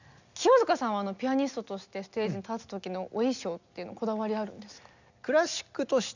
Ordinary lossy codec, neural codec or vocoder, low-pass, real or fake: none; none; 7.2 kHz; real